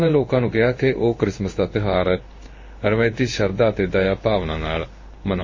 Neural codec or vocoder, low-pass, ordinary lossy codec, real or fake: codec, 16 kHz in and 24 kHz out, 1 kbps, XY-Tokenizer; 7.2 kHz; MP3, 32 kbps; fake